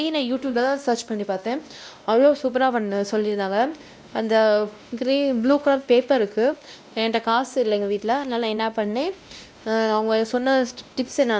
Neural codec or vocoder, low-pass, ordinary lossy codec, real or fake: codec, 16 kHz, 1 kbps, X-Codec, WavLM features, trained on Multilingual LibriSpeech; none; none; fake